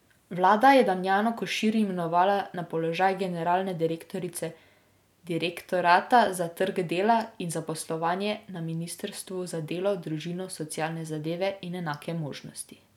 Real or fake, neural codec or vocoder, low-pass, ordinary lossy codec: real; none; 19.8 kHz; none